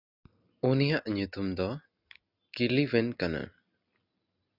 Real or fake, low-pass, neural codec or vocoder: real; 5.4 kHz; none